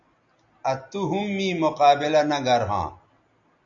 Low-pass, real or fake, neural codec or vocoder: 7.2 kHz; real; none